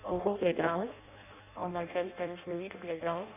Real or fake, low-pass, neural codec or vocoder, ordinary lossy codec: fake; 3.6 kHz; codec, 16 kHz in and 24 kHz out, 0.6 kbps, FireRedTTS-2 codec; none